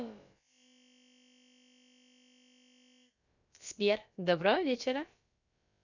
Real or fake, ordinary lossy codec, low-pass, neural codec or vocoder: fake; none; 7.2 kHz; codec, 16 kHz, about 1 kbps, DyCAST, with the encoder's durations